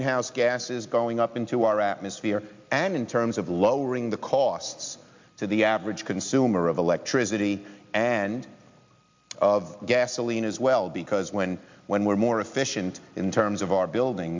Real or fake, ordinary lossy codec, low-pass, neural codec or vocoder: real; MP3, 64 kbps; 7.2 kHz; none